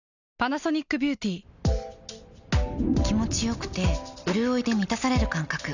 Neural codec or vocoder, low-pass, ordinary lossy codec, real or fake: none; 7.2 kHz; none; real